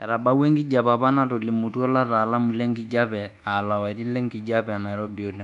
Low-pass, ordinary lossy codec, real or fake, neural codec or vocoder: 10.8 kHz; none; fake; codec, 24 kHz, 1.2 kbps, DualCodec